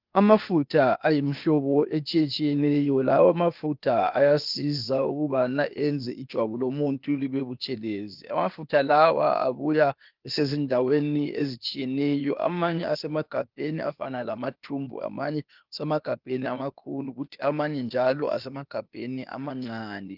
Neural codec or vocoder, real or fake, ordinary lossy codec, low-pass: codec, 16 kHz, 0.8 kbps, ZipCodec; fake; Opus, 32 kbps; 5.4 kHz